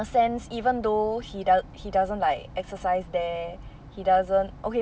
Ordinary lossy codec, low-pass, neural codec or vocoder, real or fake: none; none; none; real